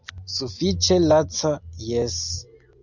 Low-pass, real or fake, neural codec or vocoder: 7.2 kHz; real; none